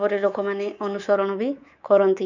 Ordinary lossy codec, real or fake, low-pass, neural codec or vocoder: none; fake; 7.2 kHz; codec, 24 kHz, 3.1 kbps, DualCodec